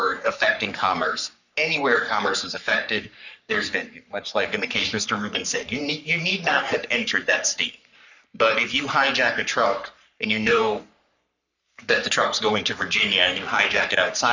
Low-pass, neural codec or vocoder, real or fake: 7.2 kHz; codec, 44.1 kHz, 3.4 kbps, Pupu-Codec; fake